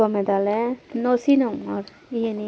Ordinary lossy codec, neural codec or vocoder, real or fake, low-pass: none; none; real; none